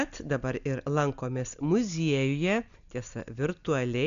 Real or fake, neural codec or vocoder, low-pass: real; none; 7.2 kHz